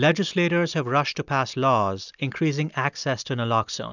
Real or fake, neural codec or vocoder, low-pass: real; none; 7.2 kHz